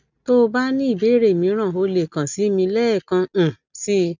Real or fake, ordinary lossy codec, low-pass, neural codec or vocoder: real; none; 7.2 kHz; none